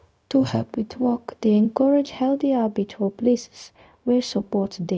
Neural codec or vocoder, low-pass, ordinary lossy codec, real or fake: codec, 16 kHz, 0.4 kbps, LongCat-Audio-Codec; none; none; fake